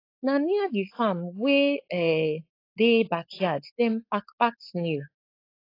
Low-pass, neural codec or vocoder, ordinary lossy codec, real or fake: 5.4 kHz; codec, 16 kHz, 4.8 kbps, FACodec; AAC, 32 kbps; fake